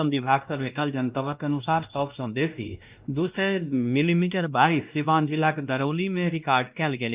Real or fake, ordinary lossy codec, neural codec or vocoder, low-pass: fake; Opus, 24 kbps; codec, 16 kHz, 1 kbps, X-Codec, WavLM features, trained on Multilingual LibriSpeech; 3.6 kHz